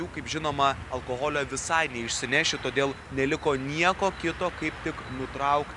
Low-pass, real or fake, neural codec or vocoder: 10.8 kHz; real; none